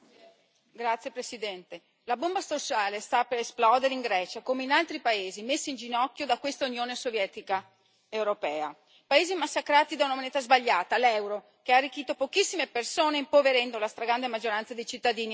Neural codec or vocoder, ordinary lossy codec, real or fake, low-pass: none; none; real; none